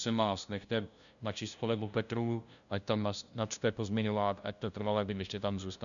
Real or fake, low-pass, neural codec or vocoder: fake; 7.2 kHz; codec, 16 kHz, 0.5 kbps, FunCodec, trained on LibriTTS, 25 frames a second